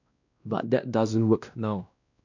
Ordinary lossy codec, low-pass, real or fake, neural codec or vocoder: none; 7.2 kHz; fake; codec, 16 kHz, 1 kbps, X-Codec, WavLM features, trained on Multilingual LibriSpeech